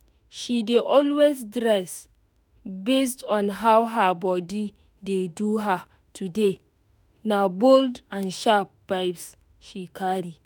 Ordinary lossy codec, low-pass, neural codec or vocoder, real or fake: none; none; autoencoder, 48 kHz, 32 numbers a frame, DAC-VAE, trained on Japanese speech; fake